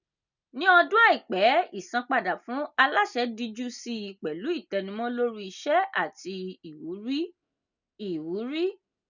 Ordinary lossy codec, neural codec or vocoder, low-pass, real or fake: none; none; 7.2 kHz; real